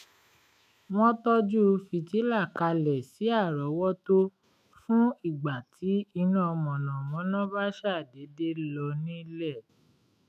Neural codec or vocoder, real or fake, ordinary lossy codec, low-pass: autoencoder, 48 kHz, 128 numbers a frame, DAC-VAE, trained on Japanese speech; fake; none; 14.4 kHz